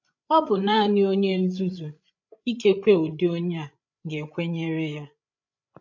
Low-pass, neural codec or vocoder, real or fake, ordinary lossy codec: 7.2 kHz; codec, 16 kHz, 8 kbps, FreqCodec, larger model; fake; none